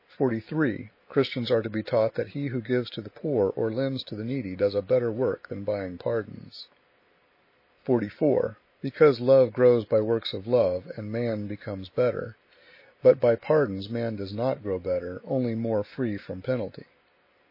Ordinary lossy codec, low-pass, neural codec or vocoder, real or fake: MP3, 24 kbps; 5.4 kHz; none; real